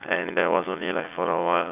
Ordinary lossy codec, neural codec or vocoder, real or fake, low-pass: none; vocoder, 44.1 kHz, 80 mel bands, Vocos; fake; 3.6 kHz